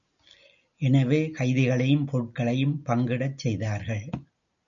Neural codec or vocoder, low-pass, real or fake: none; 7.2 kHz; real